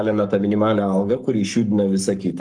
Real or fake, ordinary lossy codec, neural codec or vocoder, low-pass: fake; Opus, 32 kbps; codec, 44.1 kHz, 7.8 kbps, Pupu-Codec; 9.9 kHz